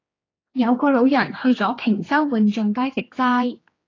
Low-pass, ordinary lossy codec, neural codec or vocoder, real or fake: 7.2 kHz; AAC, 32 kbps; codec, 16 kHz, 2 kbps, X-Codec, HuBERT features, trained on general audio; fake